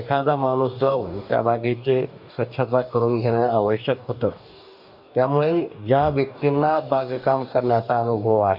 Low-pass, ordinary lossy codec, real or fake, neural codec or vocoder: 5.4 kHz; none; fake; codec, 44.1 kHz, 2.6 kbps, DAC